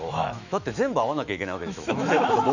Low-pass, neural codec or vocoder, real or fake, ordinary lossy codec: 7.2 kHz; autoencoder, 48 kHz, 128 numbers a frame, DAC-VAE, trained on Japanese speech; fake; none